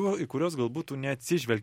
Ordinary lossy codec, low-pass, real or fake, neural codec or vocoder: MP3, 64 kbps; 19.8 kHz; real; none